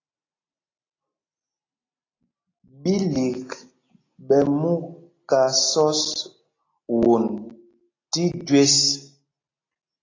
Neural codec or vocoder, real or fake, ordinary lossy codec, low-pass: none; real; AAC, 32 kbps; 7.2 kHz